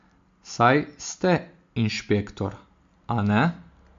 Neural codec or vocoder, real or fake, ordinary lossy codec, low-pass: none; real; MP3, 48 kbps; 7.2 kHz